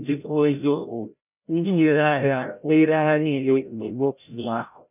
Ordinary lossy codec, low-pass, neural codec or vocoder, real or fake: none; 3.6 kHz; codec, 16 kHz, 0.5 kbps, FreqCodec, larger model; fake